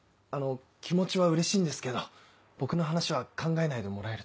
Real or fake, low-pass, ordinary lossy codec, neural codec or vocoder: real; none; none; none